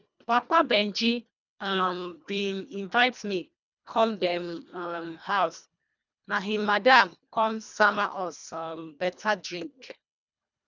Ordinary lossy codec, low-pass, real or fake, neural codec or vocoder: none; 7.2 kHz; fake; codec, 24 kHz, 1.5 kbps, HILCodec